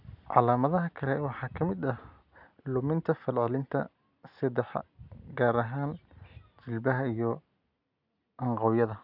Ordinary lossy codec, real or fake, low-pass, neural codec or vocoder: none; real; 5.4 kHz; none